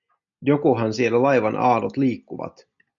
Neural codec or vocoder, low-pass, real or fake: none; 7.2 kHz; real